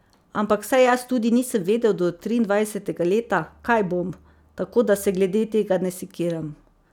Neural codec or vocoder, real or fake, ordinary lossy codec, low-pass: none; real; none; 19.8 kHz